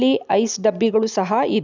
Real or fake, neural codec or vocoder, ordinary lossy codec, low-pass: real; none; none; 7.2 kHz